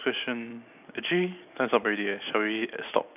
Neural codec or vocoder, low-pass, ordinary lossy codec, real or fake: none; 3.6 kHz; AAC, 32 kbps; real